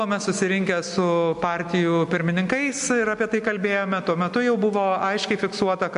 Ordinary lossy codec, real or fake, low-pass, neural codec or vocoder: MP3, 64 kbps; real; 10.8 kHz; none